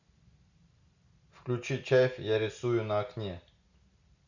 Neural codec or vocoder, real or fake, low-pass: none; real; 7.2 kHz